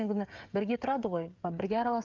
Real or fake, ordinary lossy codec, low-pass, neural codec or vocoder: fake; Opus, 32 kbps; 7.2 kHz; codec, 16 kHz, 16 kbps, FreqCodec, smaller model